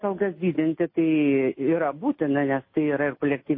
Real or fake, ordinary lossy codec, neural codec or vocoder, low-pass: real; MP3, 24 kbps; none; 5.4 kHz